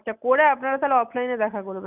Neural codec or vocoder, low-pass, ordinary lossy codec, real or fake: none; 3.6 kHz; none; real